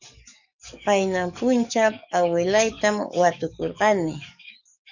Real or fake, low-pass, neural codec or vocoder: fake; 7.2 kHz; codec, 44.1 kHz, 7.8 kbps, DAC